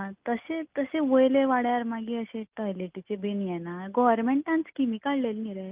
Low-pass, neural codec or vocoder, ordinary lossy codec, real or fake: 3.6 kHz; none; Opus, 24 kbps; real